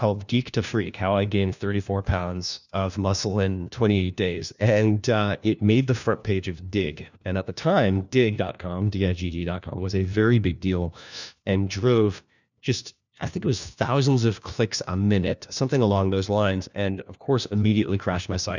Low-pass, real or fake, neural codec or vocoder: 7.2 kHz; fake; codec, 16 kHz, 1 kbps, FunCodec, trained on LibriTTS, 50 frames a second